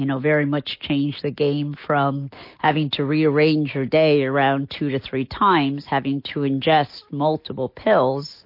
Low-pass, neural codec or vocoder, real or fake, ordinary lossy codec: 5.4 kHz; none; real; MP3, 32 kbps